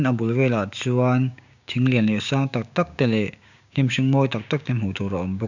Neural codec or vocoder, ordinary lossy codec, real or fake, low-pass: codec, 16 kHz, 6 kbps, DAC; none; fake; 7.2 kHz